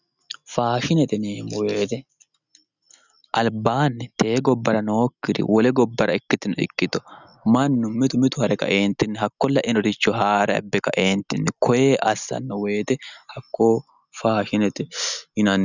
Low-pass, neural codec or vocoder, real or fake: 7.2 kHz; none; real